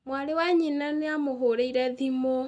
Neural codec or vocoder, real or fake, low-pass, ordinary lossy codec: none; real; 9.9 kHz; none